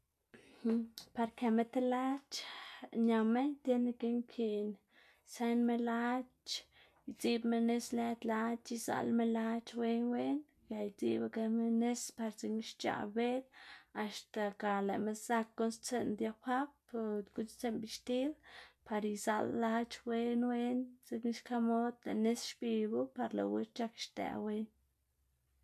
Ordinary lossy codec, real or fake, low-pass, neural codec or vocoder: none; real; 14.4 kHz; none